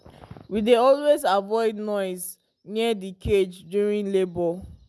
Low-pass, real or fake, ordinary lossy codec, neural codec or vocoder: none; real; none; none